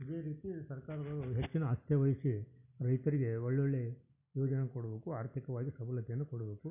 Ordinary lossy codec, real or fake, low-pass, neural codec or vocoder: none; real; 3.6 kHz; none